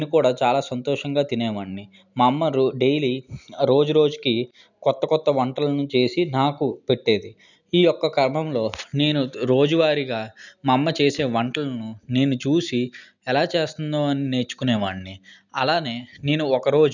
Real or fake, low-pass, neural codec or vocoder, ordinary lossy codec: real; 7.2 kHz; none; none